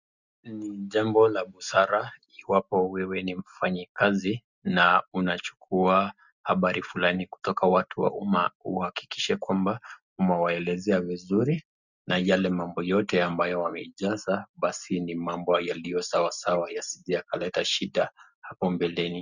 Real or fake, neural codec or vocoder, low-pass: real; none; 7.2 kHz